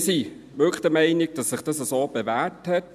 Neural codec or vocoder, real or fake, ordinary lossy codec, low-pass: none; real; none; 14.4 kHz